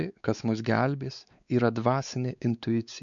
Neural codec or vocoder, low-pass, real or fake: codec, 16 kHz, 4 kbps, X-Codec, WavLM features, trained on Multilingual LibriSpeech; 7.2 kHz; fake